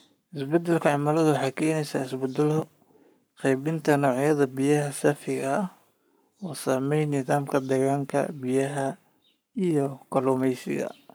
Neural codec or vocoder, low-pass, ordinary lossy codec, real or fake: codec, 44.1 kHz, 7.8 kbps, Pupu-Codec; none; none; fake